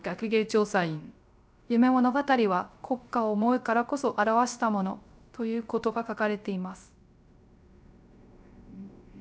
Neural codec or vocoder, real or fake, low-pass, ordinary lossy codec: codec, 16 kHz, 0.3 kbps, FocalCodec; fake; none; none